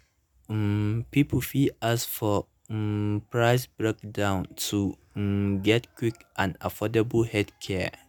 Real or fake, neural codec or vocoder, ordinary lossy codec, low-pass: real; none; none; none